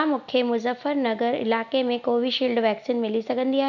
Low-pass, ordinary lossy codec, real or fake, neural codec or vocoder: 7.2 kHz; none; real; none